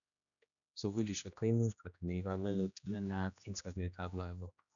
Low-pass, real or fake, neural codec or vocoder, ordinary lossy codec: 7.2 kHz; fake; codec, 16 kHz, 1 kbps, X-Codec, HuBERT features, trained on general audio; none